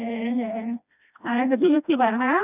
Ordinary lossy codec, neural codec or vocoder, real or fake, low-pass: none; codec, 16 kHz, 1 kbps, FreqCodec, smaller model; fake; 3.6 kHz